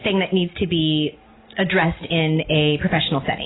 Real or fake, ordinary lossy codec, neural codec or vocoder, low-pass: real; AAC, 16 kbps; none; 7.2 kHz